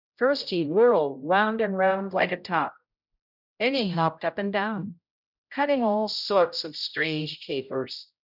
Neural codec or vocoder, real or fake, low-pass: codec, 16 kHz, 0.5 kbps, X-Codec, HuBERT features, trained on general audio; fake; 5.4 kHz